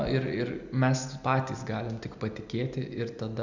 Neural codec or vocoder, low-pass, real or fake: none; 7.2 kHz; real